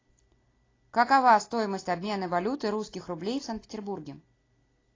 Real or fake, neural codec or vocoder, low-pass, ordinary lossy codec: real; none; 7.2 kHz; AAC, 32 kbps